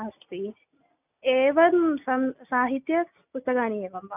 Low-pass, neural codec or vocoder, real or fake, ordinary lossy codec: 3.6 kHz; none; real; none